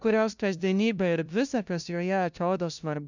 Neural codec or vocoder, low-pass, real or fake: codec, 16 kHz, 0.5 kbps, FunCodec, trained on LibriTTS, 25 frames a second; 7.2 kHz; fake